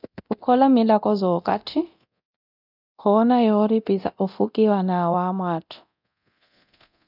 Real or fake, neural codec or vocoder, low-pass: fake; codec, 24 kHz, 0.9 kbps, DualCodec; 5.4 kHz